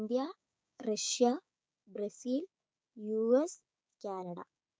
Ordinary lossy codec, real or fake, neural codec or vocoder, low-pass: none; fake; codec, 16 kHz, 6 kbps, DAC; none